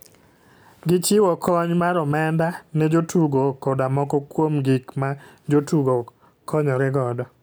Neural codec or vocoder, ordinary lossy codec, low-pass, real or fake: none; none; none; real